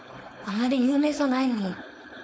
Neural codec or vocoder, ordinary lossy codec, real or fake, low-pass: codec, 16 kHz, 4.8 kbps, FACodec; none; fake; none